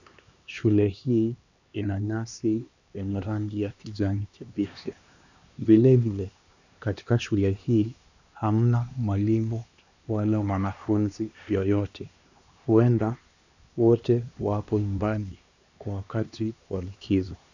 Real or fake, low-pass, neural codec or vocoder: fake; 7.2 kHz; codec, 16 kHz, 2 kbps, X-Codec, HuBERT features, trained on LibriSpeech